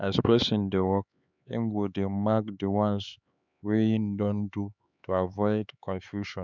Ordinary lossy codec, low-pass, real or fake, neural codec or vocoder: none; 7.2 kHz; fake; codec, 16 kHz, 4 kbps, X-Codec, HuBERT features, trained on LibriSpeech